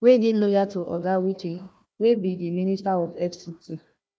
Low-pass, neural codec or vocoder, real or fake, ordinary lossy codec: none; codec, 16 kHz, 1 kbps, FunCodec, trained on Chinese and English, 50 frames a second; fake; none